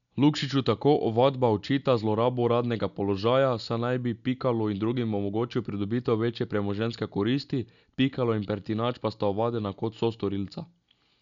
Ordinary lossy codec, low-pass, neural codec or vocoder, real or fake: none; 7.2 kHz; none; real